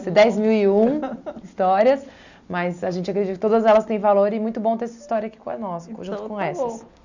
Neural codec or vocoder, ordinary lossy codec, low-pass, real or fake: none; none; 7.2 kHz; real